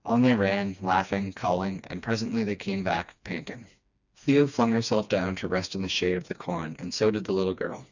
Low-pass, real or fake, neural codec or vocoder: 7.2 kHz; fake; codec, 16 kHz, 2 kbps, FreqCodec, smaller model